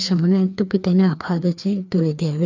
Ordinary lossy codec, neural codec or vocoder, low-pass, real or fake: none; codec, 16 kHz, 2 kbps, FreqCodec, larger model; 7.2 kHz; fake